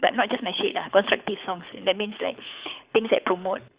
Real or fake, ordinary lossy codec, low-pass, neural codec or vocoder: fake; Opus, 64 kbps; 3.6 kHz; codec, 24 kHz, 6 kbps, HILCodec